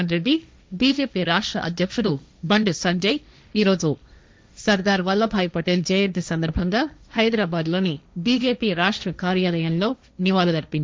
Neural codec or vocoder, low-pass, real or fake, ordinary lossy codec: codec, 16 kHz, 1.1 kbps, Voila-Tokenizer; none; fake; none